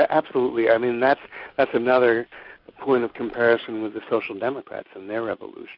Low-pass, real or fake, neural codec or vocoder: 5.4 kHz; real; none